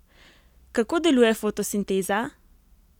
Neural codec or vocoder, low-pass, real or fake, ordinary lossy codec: none; 19.8 kHz; real; none